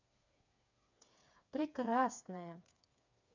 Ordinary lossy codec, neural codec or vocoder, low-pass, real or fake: none; codec, 16 kHz, 4 kbps, FreqCodec, smaller model; 7.2 kHz; fake